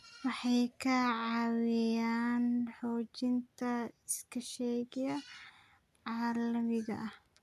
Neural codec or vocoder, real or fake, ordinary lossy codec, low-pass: none; real; none; 14.4 kHz